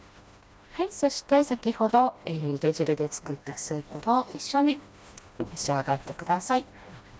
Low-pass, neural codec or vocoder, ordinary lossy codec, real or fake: none; codec, 16 kHz, 1 kbps, FreqCodec, smaller model; none; fake